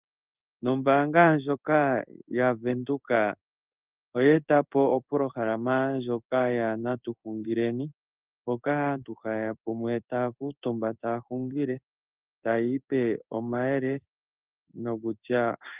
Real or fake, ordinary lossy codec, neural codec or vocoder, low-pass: fake; Opus, 32 kbps; codec, 16 kHz in and 24 kHz out, 1 kbps, XY-Tokenizer; 3.6 kHz